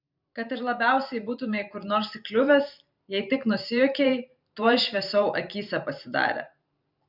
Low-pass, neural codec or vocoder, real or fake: 5.4 kHz; vocoder, 44.1 kHz, 128 mel bands every 512 samples, BigVGAN v2; fake